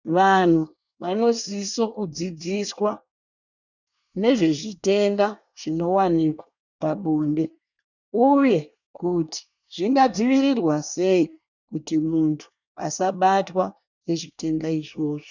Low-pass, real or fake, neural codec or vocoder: 7.2 kHz; fake; codec, 24 kHz, 1 kbps, SNAC